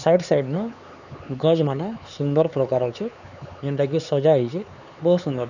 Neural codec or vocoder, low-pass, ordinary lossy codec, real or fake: codec, 16 kHz, 4 kbps, X-Codec, HuBERT features, trained on LibriSpeech; 7.2 kHz; none; fake